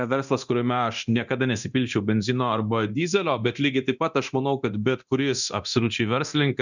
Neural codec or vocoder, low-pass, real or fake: codec, 24 kHz, 0.9 kbps, DualCodec; 7.2 kHz; fake